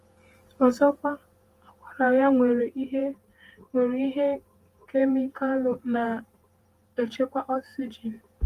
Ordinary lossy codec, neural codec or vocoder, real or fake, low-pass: Opus, 24 kbps; vocoder, 48 kHz, 128 mel bands, Vocos; fake; 14.4 kHz